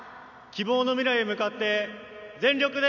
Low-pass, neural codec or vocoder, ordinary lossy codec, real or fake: 7.2 kHz; none; none; real